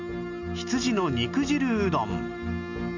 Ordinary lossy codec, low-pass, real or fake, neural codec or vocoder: none; 7.2 kHz; real; none